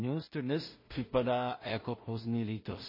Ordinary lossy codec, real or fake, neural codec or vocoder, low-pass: MP3, 24 kbps; fake; codec, 16 kHz in and 24 kHz out, 0.4 kbps, LongCat-Audio-Codec, two codebook decoder; 5.4 kHz